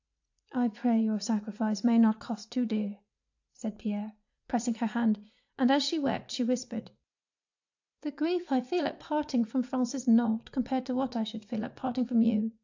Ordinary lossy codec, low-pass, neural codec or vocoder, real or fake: AAC, 48 kbps; 7.2 kHz; vocoder, 44.1 kHz, 80 mel bands, Vocos; fake